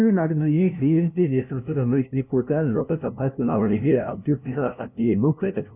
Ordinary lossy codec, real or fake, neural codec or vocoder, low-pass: none; fake; codec, 16 kHz, 0.5 kbps, FunCodec, trained on LibriTTS, 25 frames a second; 3.6 kHz